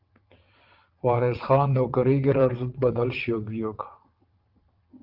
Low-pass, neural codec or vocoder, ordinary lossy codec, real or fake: 5.4 kHz; vocoder, 22.05 kHz, 80 mel bands, WaveNeXt; Opus, 32 kbps; fake